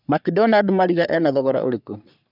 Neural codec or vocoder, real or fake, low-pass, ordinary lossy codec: codec, 44.1 kHz, 3.4 kbps, Pupu-Codec; fake; 5.4 kHz; none